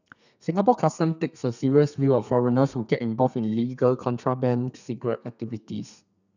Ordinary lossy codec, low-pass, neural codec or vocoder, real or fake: none; 7.2 kHz; codec, 32 kHz, 1.9 kbps, SNAC; fake